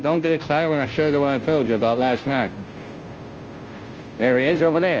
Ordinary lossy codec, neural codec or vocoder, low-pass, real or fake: Opus, 24 kbps; codec, 16 kHz, 0.5 kbps, FunCodec, trained on Chinese and English, 25 frames a second; 7.2 kHz; fake